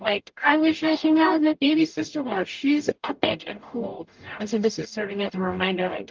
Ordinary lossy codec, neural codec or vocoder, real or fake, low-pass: Opus, 32 kbps; codec, 44.1 kHz, 0.9 kbps, DAC; fake; 7.2 kHz